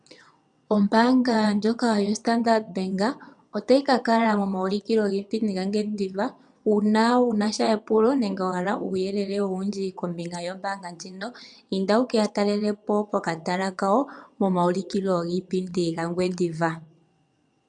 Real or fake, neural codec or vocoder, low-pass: fake; vocoder, 22.05 kHz, 80 mel bands, WaveNeXt; 9.9 kHz